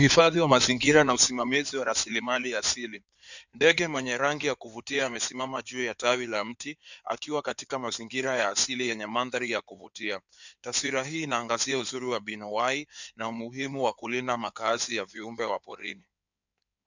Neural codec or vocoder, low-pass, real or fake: codec, 16 kHz in and 24 kHz out, 2.2 kbps, FireRedTTS-2 codec; 7.2 kHz; fake